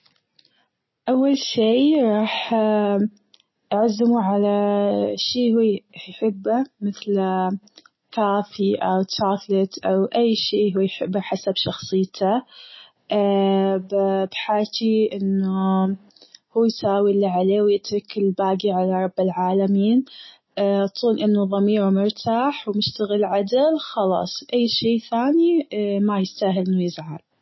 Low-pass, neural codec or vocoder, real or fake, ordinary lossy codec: 7.2 kHz; none; real; MP3, 24 kbps